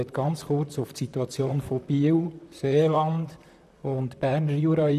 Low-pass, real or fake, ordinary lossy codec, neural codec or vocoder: 14.4 kHz; fake; none; vocoder, 44.1 kHz, 128 mel bands, Pupu-Vocoder